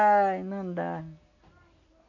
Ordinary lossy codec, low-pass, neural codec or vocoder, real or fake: none; 7.2 kHz; none; real